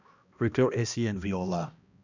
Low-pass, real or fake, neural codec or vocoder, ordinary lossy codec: 7.2 kHz; fake; codec, 16 kHz, 1 kbps, X-Codec, HuBERT features, trained on balanced general audio; none